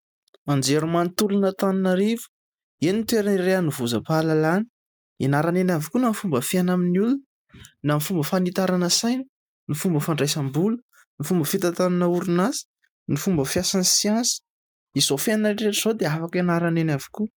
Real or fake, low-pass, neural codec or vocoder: real; 19.8 kHz; none